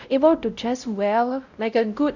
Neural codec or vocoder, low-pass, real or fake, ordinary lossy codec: codec, 16 kHz, 0.5 kbps, X-Codec, WavLM features, trained on Multilingual LibriSpeech; 7.2 kHz; fake; none